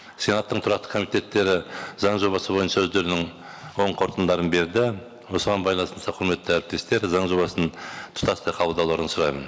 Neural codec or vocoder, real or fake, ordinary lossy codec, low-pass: none; real; none; none